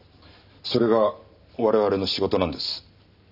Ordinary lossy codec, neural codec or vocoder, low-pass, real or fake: none; none; 5.4 kHz; real